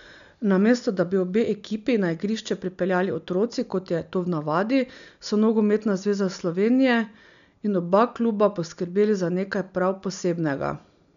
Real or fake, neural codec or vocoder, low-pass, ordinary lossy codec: real; none; 7.2 kHz; none